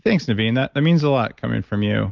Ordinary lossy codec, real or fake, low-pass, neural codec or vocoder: Opus, 32 kbps; real; 7.2 kHz; none